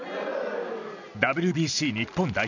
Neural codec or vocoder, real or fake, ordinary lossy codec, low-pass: none; real; none; 7.2 kHz